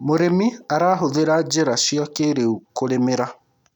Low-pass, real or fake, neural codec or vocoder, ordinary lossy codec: 19.8 kHz; real; none; none